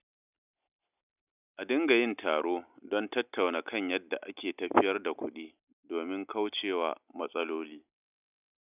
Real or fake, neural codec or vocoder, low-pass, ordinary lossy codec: real; none; 3.6 kHz; none